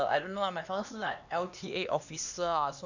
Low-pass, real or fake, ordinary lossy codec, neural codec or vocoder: 7.2 kHz; fake; none; codec, 16 kHz, 2 kbps, X-Codec, HuBERT features, trained on LibriSpeech